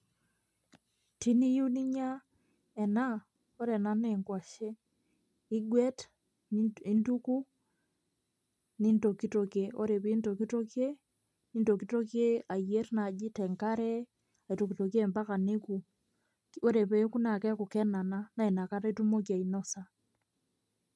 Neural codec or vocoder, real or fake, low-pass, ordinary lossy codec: none; real; none; none